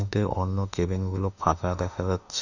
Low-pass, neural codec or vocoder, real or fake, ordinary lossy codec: 7.2 kHz; autoencoder, 48 kHz, 32 numbers a frame, DAC-VAE, trained on Japanese speech; fake; none